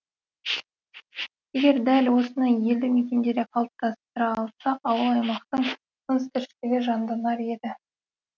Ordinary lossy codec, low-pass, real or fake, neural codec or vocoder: none; 7.2 kHz; real; none